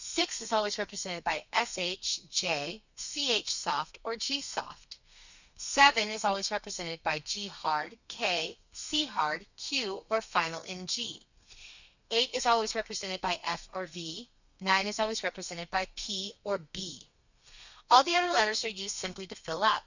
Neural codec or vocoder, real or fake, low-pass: codec, 32 kHz, 1.9 kbps, SNAC; fake; 7.2 kHz